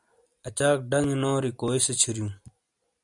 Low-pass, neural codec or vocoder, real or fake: 10.8 kHz; none; real